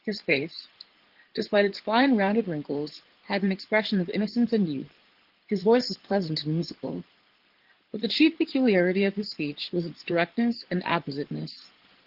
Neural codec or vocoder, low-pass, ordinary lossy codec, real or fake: codec, 16 kHz in and 24 kHz out, 2.2 kbps, FireRedTTS-2 codec; 5.4 kHz; Opus, 16 kbps; fake